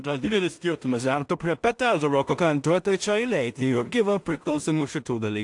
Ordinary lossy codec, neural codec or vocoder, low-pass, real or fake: AAC, 64 kbps; codec, 16 kHz in and 24 kHz out, 0.4 kbps, LongCat-Audio-Codec, two codebook decoder; 10.8 kHz; fake